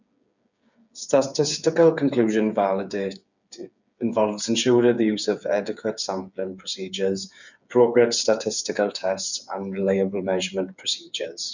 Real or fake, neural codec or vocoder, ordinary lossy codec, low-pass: fake; codec, 16 kHz, 8 kbps, FreqCodec, smaller model; none; 7.2 kHz